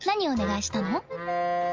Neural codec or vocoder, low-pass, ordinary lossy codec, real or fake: none; 7.2 kHz; Opus, 32 kbps; real